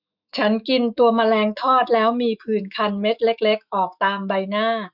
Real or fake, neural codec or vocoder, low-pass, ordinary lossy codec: fake; autoencoder, 48 kHz, 128 numbers a frame, DAC-VAE, trained on Japanese speech; 5.4 kHz; none